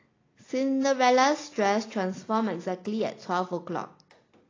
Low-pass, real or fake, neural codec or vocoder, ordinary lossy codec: 7.2 kHz; real; none; AAC, 32 kbps